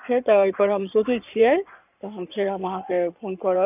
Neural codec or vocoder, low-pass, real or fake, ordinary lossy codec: codec, 16 kHz in and 24 kHz out, 2.2 kbps, FireRedTTS-2 codec; 3.6 kHz; fake; none